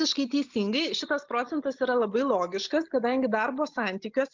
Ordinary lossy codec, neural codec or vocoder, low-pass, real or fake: MP3, 64 kbps; none; 7.2 kHz; real